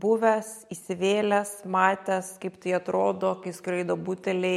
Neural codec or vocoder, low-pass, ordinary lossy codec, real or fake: none; 19.8 kHz; MP3, 64 kbps; real